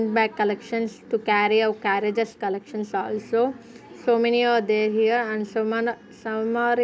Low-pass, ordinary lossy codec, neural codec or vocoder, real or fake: none; none; none; real